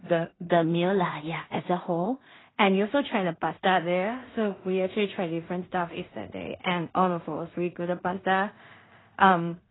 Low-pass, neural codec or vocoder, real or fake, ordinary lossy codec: 7.2 kHz; codec, 16 kHz in and 24 kHz out, 0.4 kbps, LongCat-Audio-Codec, two codebook decoder; fake; AAC, 16 kbps